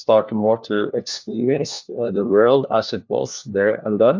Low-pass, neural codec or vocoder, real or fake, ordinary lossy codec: 7.2 kHz; codec, 16 kHz, 1 kbps, FunCodec, trained on LibriTTS, 50 frames a second; fake; MP3, 64 kbps